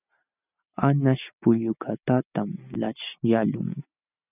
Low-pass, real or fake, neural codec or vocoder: 3.6 kHz; real; none